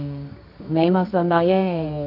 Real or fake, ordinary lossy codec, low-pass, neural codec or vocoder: fake; none; 5.4 kHz; codec, 24 kHz, 0.9 kbps, WavTokenizer, medium music audio release